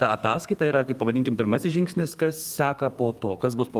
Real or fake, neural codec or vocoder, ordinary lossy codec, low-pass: fake; codec, 32 kHz, 1.9 kbps, SNAC; Opus, 32 kbps; 14.4 kHz